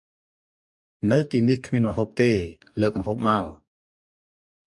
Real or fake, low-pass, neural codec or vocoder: fake; 10.8 kHz; codec, 44.1 kHz, 2.6 kbps, DAC